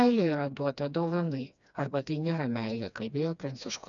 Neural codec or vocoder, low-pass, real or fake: codec, 16 kHz, 2 kbps, FreqCodec, smaller model; 7.2 kHz; fake